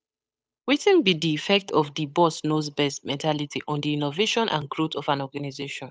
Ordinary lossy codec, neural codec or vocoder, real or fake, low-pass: none; codec, 16 kHz, 8 kbps, FunCodec, trained on Chinese and English, 25 frames a second; fake; none